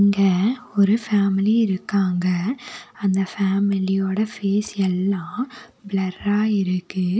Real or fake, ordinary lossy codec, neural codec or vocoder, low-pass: real; none; none; none